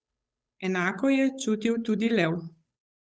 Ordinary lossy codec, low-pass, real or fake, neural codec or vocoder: none; none; fake; codec, 16 kHz, 8 kbps, FunCodec, trained on Chinese and English, 25 frames a second